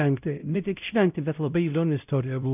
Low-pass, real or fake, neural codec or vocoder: 3.6 kHz; fake; codec, 16 kHz, 0.5 kbps, X-Codec, WavLM features, trained on Multilingual LibriSpeech